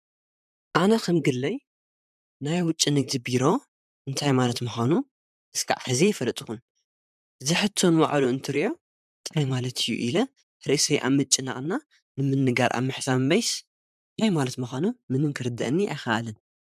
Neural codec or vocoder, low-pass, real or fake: vocoder, 44.1 kHz, 128 mel bands, Pupu-Vocoder; 14.4 kHz; fake